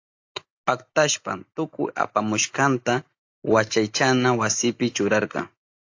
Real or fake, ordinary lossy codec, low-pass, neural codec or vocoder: real; AAC, 48 kbps; 7.2 kHz; none